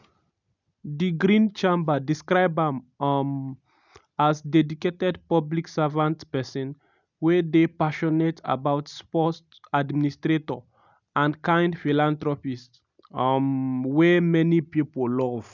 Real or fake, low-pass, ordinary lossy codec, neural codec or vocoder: real; 7.2 kHz; none; none